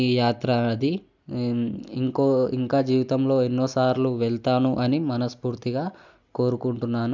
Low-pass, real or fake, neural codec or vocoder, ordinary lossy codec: 7.2 kHz; real; none; none